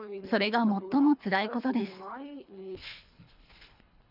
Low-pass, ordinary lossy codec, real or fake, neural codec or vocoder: 5.4 kHz; none; fake; codec, 24 kHz, 3 kbps, HILCodec